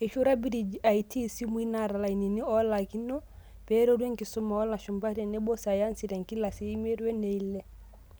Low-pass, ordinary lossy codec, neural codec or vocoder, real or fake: none; none; none; real